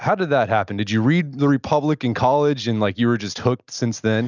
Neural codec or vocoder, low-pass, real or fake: none; 7.2 kHz; real